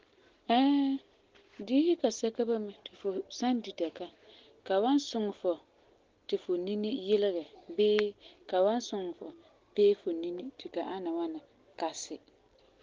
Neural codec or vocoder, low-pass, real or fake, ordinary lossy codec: none; 7.2 kHz; real; Opus, 16 kbps